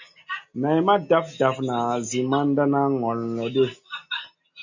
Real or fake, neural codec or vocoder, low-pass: real; none; 7.2 kHz